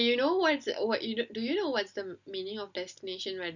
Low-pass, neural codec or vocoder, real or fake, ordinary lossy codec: 7.2 kHz; none; real; none